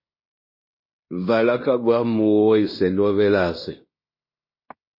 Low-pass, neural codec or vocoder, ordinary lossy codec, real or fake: 5.4 kHz; codec, 16 kHz in and 24 kHz out, 0.9 kbps, LongCat-Audio-Codec, fine tuned four codebook decoder; MP3, 24 kbps; fake